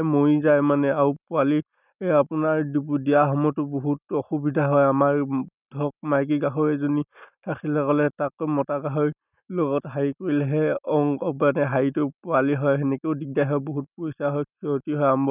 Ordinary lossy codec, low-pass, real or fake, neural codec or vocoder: none; 3.6 kHz; real; none